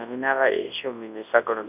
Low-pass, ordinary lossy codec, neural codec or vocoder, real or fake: 3.6 kHz; none; codec, 24 kHz, 0.9 kbps, WavTokenizer, large speech release; fake